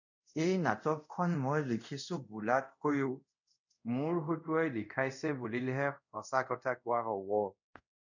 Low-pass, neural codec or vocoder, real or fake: 7.2 kHz; codec, 24 kHz, 0.5 kbps, DualCodec; fake